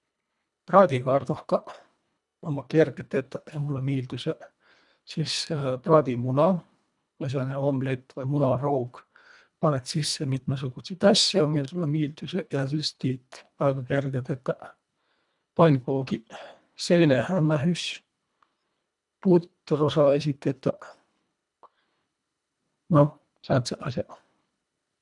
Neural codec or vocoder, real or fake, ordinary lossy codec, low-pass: codec, 24 kHz, 1.5 kbps, HILCodec; fake; none; 10.8 kHz